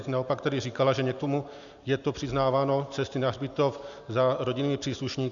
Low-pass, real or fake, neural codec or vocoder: 7.2 kHz; real; none